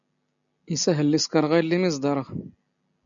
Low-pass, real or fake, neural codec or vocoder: 7.2 kHz; real; none